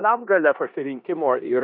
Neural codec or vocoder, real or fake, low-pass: codec, 16 kHz in and 24 kHz out, 0.9 kbps, LongCat-Audio-Codec, four codebook decoder; fake; 5.4 kHz